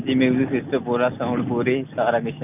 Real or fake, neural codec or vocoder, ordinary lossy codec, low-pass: real; none; none; 3.6 kHz